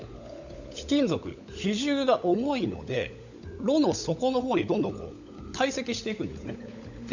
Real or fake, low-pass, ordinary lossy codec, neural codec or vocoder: fake; 7.2 kHz; none; codec, 16 kHz, 16 kbps, FunCodec, trained on LibriTTS, 50 frames a second